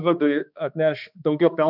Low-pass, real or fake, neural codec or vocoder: 5.4 kHz; fake; codec, 16 kHz, 2 kbps, X-Codec, HuBERT features, trained on balanced general audio